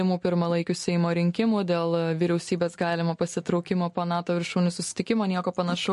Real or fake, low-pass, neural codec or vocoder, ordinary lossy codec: real; 14.4 kHz; none; MP3, 48 kbps